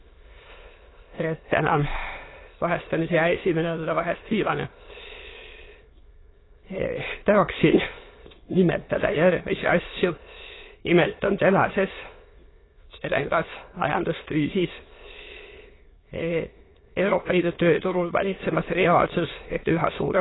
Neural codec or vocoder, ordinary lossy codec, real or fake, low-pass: autoencoder, 22.05 kHz, a latent of 192 numbers a frame, VITS, trained on many speakers; AAC, 16 kbps; fake; 7.2 kHz